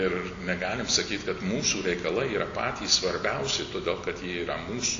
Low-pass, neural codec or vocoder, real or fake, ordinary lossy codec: 7.2 kHz; none; real; AAC, 64 kbps